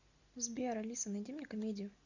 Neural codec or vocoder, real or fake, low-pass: none; real; 7.2 kHz